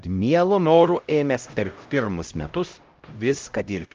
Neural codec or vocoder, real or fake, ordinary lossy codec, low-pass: codec, 16 kHz, 0.5 kbps, X-Codec, HuBERT features, trained on LibriSpeech; fake; Opus, 24 kbps; 7.2 kHz